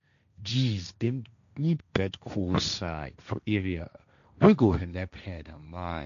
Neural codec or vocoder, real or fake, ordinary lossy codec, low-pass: codec, 16 kHz, 1.1 kbps, Voila-Tokenizer; fake; none; 7.2 kHz